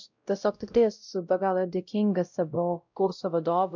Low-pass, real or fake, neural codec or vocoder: 7.2 kHz; fake; codec, 16 kHz, 0.5 kbps, X-Codec, WavLM features, trained on Multilingual LibriSpeech